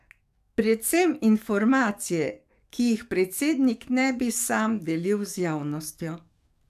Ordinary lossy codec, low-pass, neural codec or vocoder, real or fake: none; 14.4 kHz; codec, 44.1 kHz, 7.8 kbps, DAC; fake